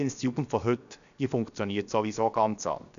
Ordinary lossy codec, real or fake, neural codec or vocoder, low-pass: none; fake; codec, 16 kHz, 0.7 kbps, FocalCodec; 7.2 kHz